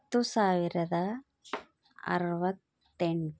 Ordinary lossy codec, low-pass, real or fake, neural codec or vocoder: none; none; real; none